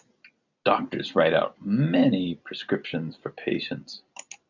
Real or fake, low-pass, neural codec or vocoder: real; 7.2 kHz; none